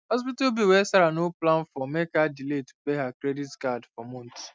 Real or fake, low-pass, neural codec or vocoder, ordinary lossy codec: real; none; none; none